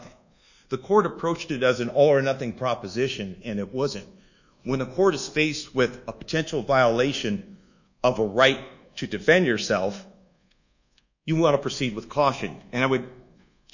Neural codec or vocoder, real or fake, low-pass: codec, 24 kHz, 1.2 kbps, DualCodec; fake; 7.2 kHz